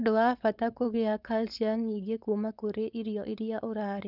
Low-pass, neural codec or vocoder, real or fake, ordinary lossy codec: 5.4 kHz; codec, 16 kHz, 4.8 kbps, FACodec; fake; none